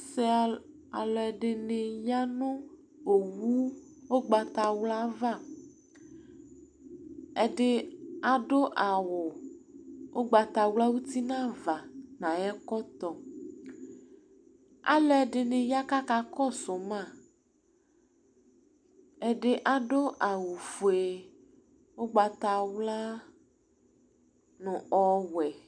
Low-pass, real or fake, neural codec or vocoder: 9.9 kHz; real; none